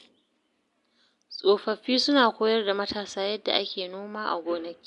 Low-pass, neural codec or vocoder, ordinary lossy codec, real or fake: 10.8 kHz; none; MP3, 64 kbps; real